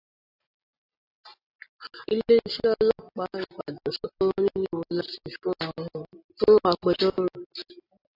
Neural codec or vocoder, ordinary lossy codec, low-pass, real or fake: none; AAC, 32 kbps; 5.4 kHz; real